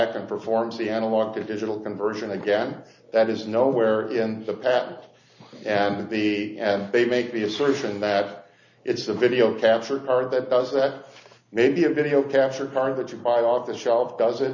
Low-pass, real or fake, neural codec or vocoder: 7.2 kHz; real; none